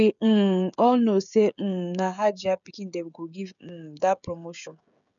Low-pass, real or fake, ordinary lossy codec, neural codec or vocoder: 7.2 kHz; fake; MP3, 96 kbps; codec, 16 kHz, 16 kbps, FreqCodec, smaller model